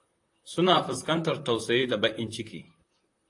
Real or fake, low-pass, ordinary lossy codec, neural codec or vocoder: fake; 10.8 kHz; AAC, 48 kbps; vocoder, 44.1 kHz, 128 mel bands, Pupu-Vocoder